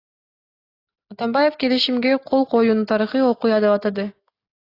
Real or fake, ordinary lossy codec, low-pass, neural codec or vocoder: real; AAC, 32 kbps; 5.4 kHz; none